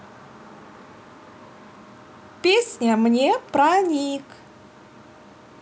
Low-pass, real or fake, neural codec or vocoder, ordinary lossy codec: none; real; none; none